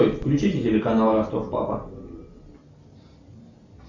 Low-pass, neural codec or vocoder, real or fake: 7.2 kHz; none; real